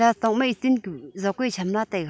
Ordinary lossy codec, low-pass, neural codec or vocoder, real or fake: none; none; none; real